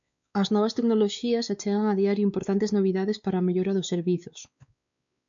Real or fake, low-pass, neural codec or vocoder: fake; 7.2 kHz; codec, 16 kHz, 4 kbps, X-Codec, WavLM features, trained on Multilingual LibriSpeech